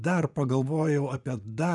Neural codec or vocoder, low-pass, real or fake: vocoder, 24 kHz, 100 mel bands, Vocos; 10.8 kHz; fake